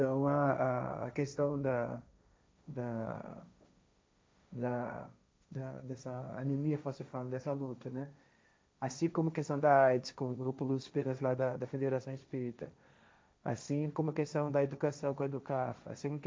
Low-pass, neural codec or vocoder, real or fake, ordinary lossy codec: none; codec, 16 kHz, 1.1 kbps, Voila-Tokenizer; fake; none